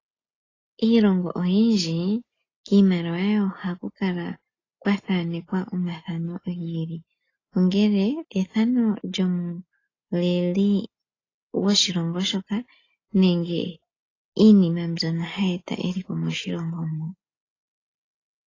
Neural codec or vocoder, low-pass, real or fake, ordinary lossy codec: none; 7.2 kHz; real; AAC, 32 kbps